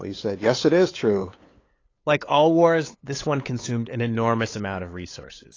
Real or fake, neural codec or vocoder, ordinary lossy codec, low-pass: fake; codec, 16 kHz, 8 kbps, FunCodec, trained on LibriTTS, 25 frames a second; AAC, 32 kbps; 7.2 kHz